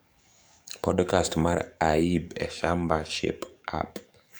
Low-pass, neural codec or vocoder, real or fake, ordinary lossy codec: none; codec, 44.1 kHz, 7.8 kbps, DAC; fake; none